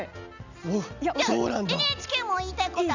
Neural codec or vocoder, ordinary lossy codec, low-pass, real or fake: none; none; 7.2 kHz; real